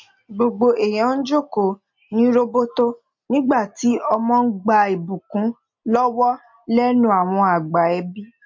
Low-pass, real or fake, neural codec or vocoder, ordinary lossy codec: 7.2 kHz; real; none; MP3, 48 kbps